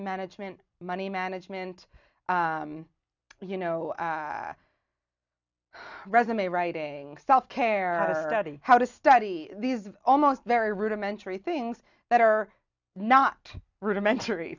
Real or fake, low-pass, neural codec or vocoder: real; 7.2 kHz; none